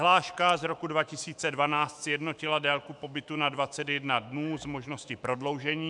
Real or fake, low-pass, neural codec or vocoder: fake; 10.8 kHz; autoencoder, 48 kHz, 128 numbers a frame, DAC-VAE, trained on Japanese speech